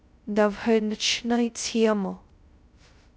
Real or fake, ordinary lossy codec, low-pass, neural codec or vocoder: fake; none; none; codec, 16 kHz, 0.2 kbps, FocalCodec